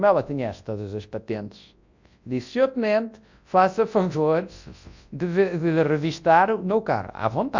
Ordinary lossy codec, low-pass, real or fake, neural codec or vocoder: MP3, 64 kbps; 7.2 kHz; fake; codec, 24 kHz, 0.9 kbps, WavTokenizer, large speech release